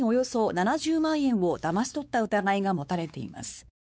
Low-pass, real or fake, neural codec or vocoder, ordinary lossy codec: none; fake; codec, 16 kHz, 4 kbps, X-Codec, WavLM features, trained on Multilingual LibriSpeech; none